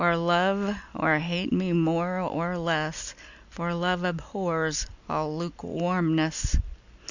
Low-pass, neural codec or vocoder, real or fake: 7.2 kHz; none; real